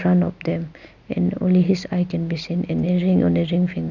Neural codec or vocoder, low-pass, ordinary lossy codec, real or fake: none; 7.2 kHz; none; real